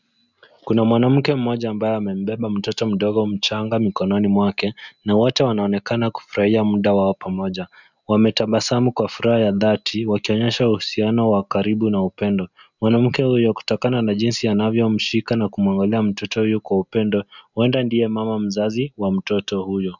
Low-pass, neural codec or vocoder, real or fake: 7.2 kHz; none; real